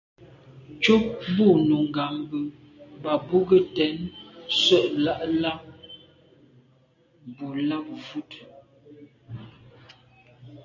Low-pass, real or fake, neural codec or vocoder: 7.2 kHz; real; none